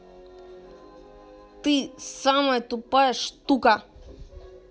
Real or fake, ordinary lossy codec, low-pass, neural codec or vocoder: real; none; none; none